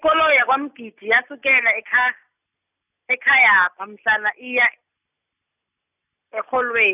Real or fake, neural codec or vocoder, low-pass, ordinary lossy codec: real; none; 3.6 kHz; none